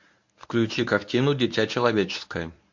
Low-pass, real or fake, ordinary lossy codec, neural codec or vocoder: 7.2 kHz; fake; MP3, 48 kbps; codec, 24 kHz, 0.9 kbps, WavTokenizer, medium speech release version 1